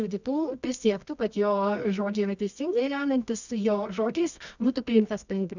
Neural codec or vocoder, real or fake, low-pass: codec, 24 kHz, 0.9 kbps, WavTokenizer, medium music audio release; fake; 7.2 kHz